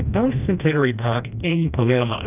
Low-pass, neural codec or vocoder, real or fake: 3.6 kHz; codec, 16 kHz, 1 kbps, FreqCodec, smaller model; fake